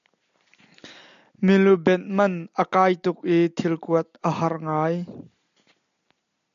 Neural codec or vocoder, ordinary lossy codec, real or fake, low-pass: none; AAC, 96 kbps; real; 7.2 kHz